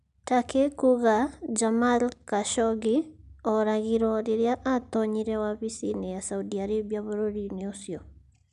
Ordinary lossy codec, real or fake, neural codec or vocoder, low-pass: none; real; none; 10.8 kHz